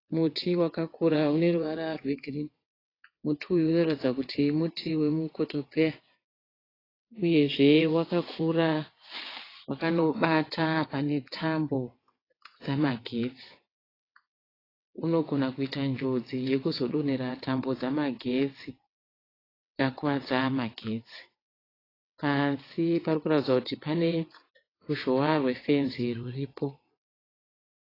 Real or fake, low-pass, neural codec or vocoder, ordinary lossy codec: fake; 5.4 kHz; vocoder, 22.05 kHz, 80 mel bands, WaveNeXt; AAC, 24 kbps